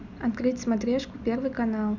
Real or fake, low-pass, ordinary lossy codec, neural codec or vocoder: real; 7.2 kHz; none; none